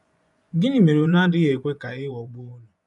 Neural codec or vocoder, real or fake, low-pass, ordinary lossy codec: vocoder, 24 kHz, 100 mel bands, Vocos; fake; 10.8 kHz; none